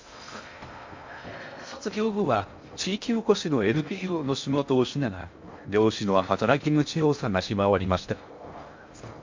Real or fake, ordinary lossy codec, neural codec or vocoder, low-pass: fake; MP3, 48 kbps; codec, 16 kHz in and 24 kHz out, 0.6 kbps, FocalCodec, streaming, 2048 codes; 7.2 kHz